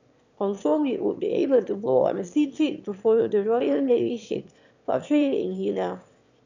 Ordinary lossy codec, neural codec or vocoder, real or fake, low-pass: none; autoencoder, 22.05 kHz, a latent of 192 numbers a frame, VITS, trained on one speaker; fake; 7.2 kHz